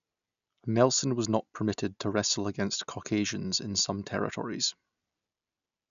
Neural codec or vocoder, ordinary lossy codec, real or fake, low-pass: none; none; real; 7.2 kHz